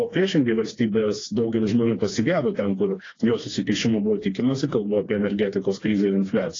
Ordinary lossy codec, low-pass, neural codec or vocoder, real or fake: AAC, 32 kbps; 7.2 kHz; codec, 16 kHz, 2 kbps, FreqCodec, smaller model; fake